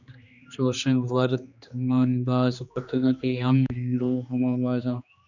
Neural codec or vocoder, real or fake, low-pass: codec, 16 kHz, 2 kbps, X-Codec, HuBERT features, trained on general audio; fake; 7.2 kHz